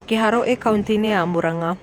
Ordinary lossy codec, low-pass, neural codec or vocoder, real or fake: none; 19.8 kHz; vocoder, 48 kHz, 128 mel bands, Vocos; fake